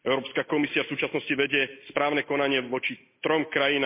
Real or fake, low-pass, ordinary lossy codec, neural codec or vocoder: real; 3.6 kHz; MP3, 24 kbps; none